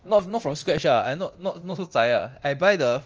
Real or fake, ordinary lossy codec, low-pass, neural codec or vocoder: fake; Opus, 24 kbps; 7.2 kHz; codec, 24 kHz, 0.9 kbps, DualCodec